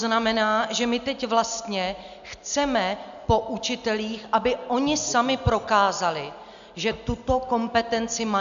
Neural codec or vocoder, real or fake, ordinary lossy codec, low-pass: none; real; AAC, 96 kbps; 7.2 kHz